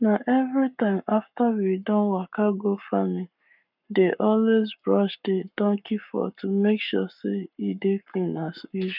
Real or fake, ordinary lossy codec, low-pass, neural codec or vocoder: fake; none; 5.4 kHz; codec, 44.1 kHz, 7.8 kbps, Pupu-Codec